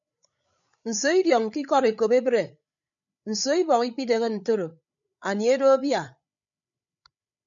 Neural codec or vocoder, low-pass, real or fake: codec, 16 kHz, 8 kbps, FreqCodec, larger model; 7.2 kHz; fake